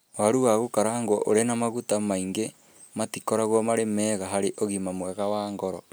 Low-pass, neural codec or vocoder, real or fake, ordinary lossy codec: none; none; real; none